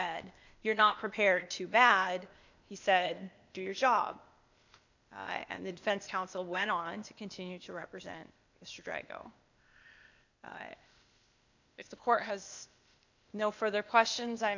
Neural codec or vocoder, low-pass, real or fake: codec, 16 kHz, 0.8 kbps, ZipCodec; 7.2 kHz; fake